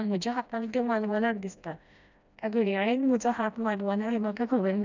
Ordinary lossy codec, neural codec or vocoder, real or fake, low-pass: none; codec, 16 kHz, 1 kbps, FreqCodec, smaller model; fake; 7.2 kHz